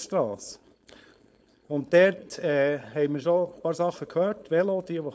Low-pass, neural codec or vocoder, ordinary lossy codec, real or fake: none; codec, 16 kHz, 4.8 kbps, FACodec; none; fake